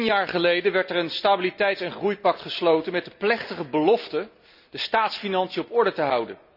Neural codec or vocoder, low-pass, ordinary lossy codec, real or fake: none; 5.4 kHz; none; real